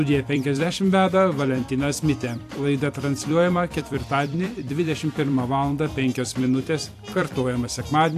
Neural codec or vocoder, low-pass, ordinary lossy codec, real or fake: vocoder, 44.1 kHz, 128 mel bands every 256 samples, BigVGAN v2; 14.4 kHz; AAC, 64 kbps; fake